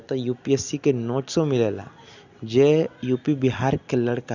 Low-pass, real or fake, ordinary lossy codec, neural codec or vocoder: 7.2 kHz; real; none; none